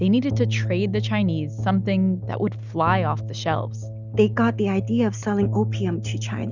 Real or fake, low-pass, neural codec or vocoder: real; 7.2 kHz; none